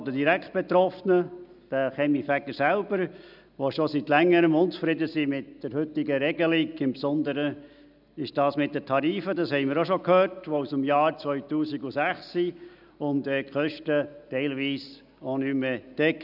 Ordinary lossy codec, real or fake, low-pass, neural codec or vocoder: none; real; 5.4 kHz; none